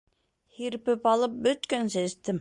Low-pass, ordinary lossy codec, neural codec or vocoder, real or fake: 10.8 kHz; Opus, 64 kbps; none; real